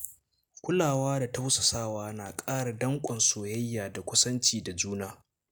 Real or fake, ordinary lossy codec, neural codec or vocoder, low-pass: real; none; none; none